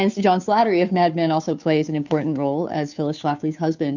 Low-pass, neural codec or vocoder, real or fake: 7.2 kHz; codec, 16 kHz, 2 kbps, FunCodec, trained on Chinese and English, 25 frames a second; fake